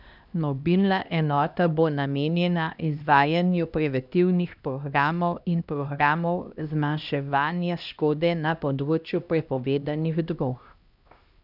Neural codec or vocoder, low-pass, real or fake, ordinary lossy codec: codec, 16 kHz, 1 kbps, X-Codec, HuBERT features, trained on LibriSpeech; 5.4 kHz; fake; none